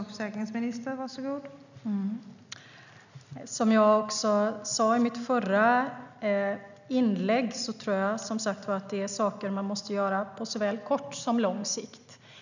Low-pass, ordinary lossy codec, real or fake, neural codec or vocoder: 7.2 kHz; none; real; none